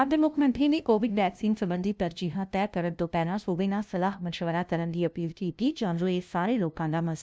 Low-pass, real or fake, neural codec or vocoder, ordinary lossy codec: none; fake; codec, 16 kHz, 0.5 kbps, FunCodec, trained on LibriTTS, 25 frames a second; none